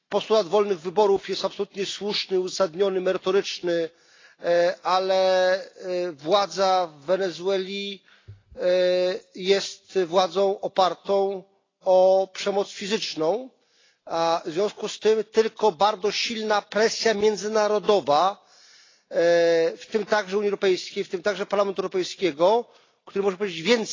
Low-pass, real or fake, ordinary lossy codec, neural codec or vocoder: 7.2 kHz; real; AAC, 32 kbps; none